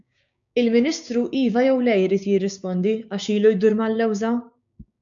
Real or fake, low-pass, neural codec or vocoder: fake; 7.2 kHz; codec, 16 kHz, 6 kbps, DAC